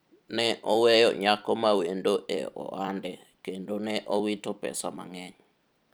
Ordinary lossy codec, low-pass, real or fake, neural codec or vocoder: none; none; fake; vocoder, 44.1 kHz, 128 mel bands every 256 samples, BigVGAN v2